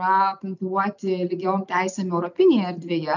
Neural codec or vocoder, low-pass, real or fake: none; 7.2 kHz; real